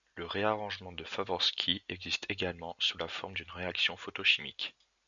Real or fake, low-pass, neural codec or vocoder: real; 7.2 kHz; none